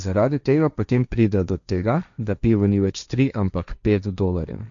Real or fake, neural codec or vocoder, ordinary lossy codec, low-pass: fake; codec, 16 kHz, 1.1 kbps, Voila-Tokenizer; none; 7.2 kHz